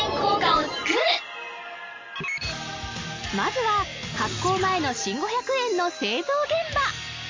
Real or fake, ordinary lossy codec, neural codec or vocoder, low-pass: real; MP3, 48 kbps; none; 7.2 kHz